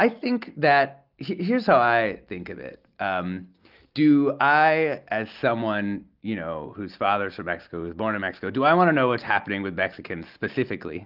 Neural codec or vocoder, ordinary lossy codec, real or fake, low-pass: none; Opus, 24 kbps; real; 5.4 kHz